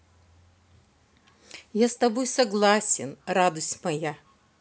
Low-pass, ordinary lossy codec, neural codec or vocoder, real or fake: none; none; none; real